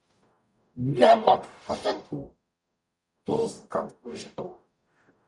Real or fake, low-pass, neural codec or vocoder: fake; 10.8 kHz; codec, 44.1 kHz, 0.9 kbps, DAC